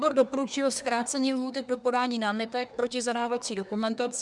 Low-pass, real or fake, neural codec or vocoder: 10.8 kHz; fake; codec, 24 kHz, 1 kbps, SNAC